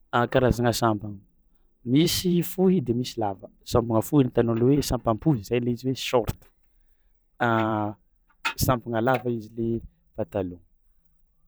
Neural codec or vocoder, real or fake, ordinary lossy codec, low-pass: vocoder, 44.1 kHz, 128 mel bands every 512 samples, BigVGAN v2; fake; none; none